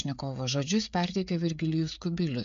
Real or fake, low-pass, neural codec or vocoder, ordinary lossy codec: fake; 7.2 kHz; codec, 16 kHz, 16 kbps, FreqCodec, smaller model; MP3, 48 kbps